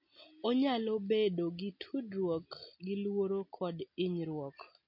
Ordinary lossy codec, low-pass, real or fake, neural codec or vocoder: MP3, 32 kbps; 5.4 kHz; real; none